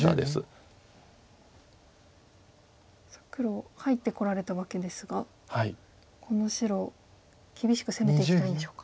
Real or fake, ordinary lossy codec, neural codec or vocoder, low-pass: real; none; none; none